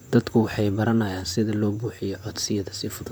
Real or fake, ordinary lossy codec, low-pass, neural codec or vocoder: fake; none; none; vocoder, 44.1 kHz, 128 mel bands, Pupu-Vocoder